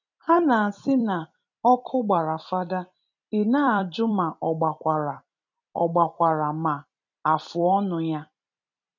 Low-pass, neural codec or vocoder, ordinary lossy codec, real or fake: 7.2 kHz; vocoder, 44.1 kHz, 128 mel bands every 256 samples, BigVGAN v2; none; fake